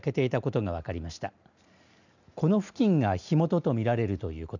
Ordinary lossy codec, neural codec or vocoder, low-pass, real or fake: none; none; 7.2 kHz; real